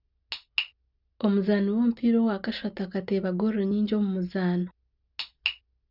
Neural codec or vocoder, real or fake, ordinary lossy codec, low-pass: none; real; none; 5.4 kHz